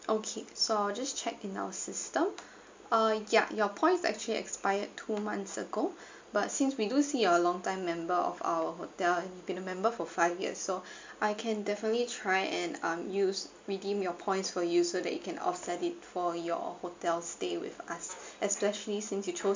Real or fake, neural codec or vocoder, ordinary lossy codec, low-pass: real; none; MP3, 64 kbps; 7.2 kHz